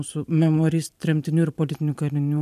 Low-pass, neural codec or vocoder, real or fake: 14.4 kHz; vocoder, 44.1 kHz, 128 mel bands every 512 samples, BigVGAN v2; fake